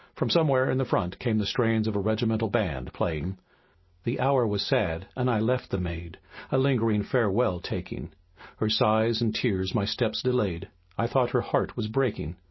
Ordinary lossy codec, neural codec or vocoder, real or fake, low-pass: MP3, 24 kbps; none; real; 7.2 kHz